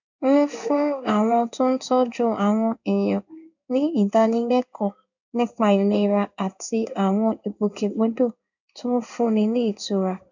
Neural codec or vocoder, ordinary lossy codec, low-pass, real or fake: codec, 16 kHz in and 24 kHz out, 1 kbps, XY-Tokenizer; none; 7.2 kHz; fake